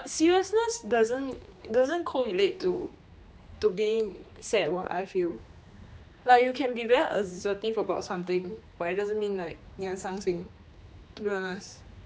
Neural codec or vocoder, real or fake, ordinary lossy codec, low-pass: codec, 16 kHz, 2 kbps, X-Codec, HuBERT features, trained on general audio; fake; none; none